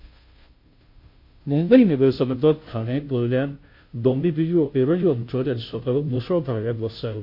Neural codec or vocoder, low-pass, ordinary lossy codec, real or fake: codec, 16 kHz, 0.5 kbps, FunCodec, trained on Chinese and English, 25 frames a second; 5.4 kHz; MP3, 32 kbps; fake